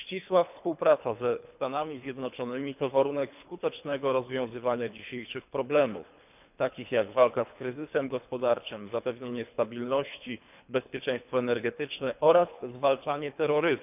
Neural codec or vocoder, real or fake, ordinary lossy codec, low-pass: codec, 24 kHz, 3 kbps, HILCodec; fake; none; 3.6 kHz